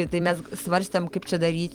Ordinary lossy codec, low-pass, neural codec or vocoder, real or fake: Opus, 24 kbps; 19.8 kHz; vocoder, 44.1 kHz, 128 mel bands every 256 samples, BigVGAN v2; fake